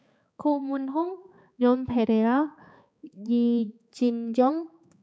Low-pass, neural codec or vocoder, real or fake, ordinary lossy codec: none; codec, 16 kHz, 4 kbps, X-Codec, HuBERT features, trained on balanced general audio; fake; none